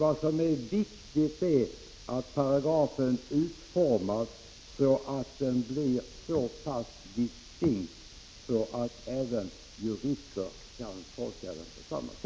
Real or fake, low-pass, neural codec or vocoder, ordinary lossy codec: real; none; none; none